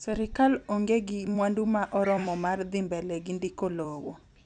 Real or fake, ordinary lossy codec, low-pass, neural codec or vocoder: fake; none; 10.8 kHz; vocoder, 44.1 kHz, 128 mel bands every 256 samples, BigVGAN v2